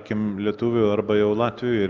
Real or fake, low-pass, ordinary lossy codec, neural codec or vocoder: real; 7.2 kHz; Opus, 32 kbps; none